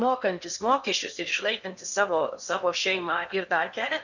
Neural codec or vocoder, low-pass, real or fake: codec, 16 kHz in and 24 kHz out, 0.8 kbps, FocalCodec, streaming, 65536 codes; 7.2 kHz; fake